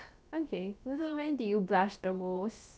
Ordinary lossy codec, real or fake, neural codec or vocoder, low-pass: none; fake; codec, 16 kHz, about 1 kbps, DyCAST, with the encoder's durations; none